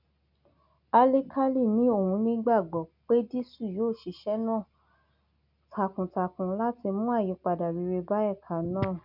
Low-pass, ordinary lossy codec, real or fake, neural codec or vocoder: 5.4 kHz; none; real; none